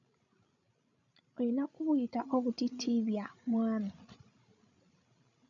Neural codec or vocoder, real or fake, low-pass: codec, 16 kHz, 16 kbps, FreqCodec, larger model; fake; 7.2 kHz